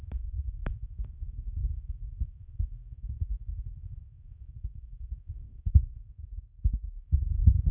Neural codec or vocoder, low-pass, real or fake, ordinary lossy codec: codec, 16 kHz, 2 kbps, X-Codec, HuBERT features, trained on general audio; 3.6 kHz; fake; none